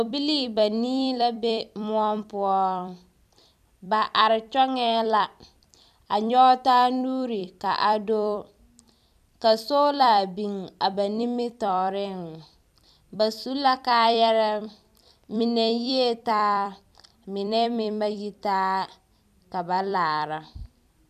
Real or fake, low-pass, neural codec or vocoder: fake; 14.4 kHz; vocoder, 44.1 kHz, 128 mel bands every 256 samples, BigVGAN v2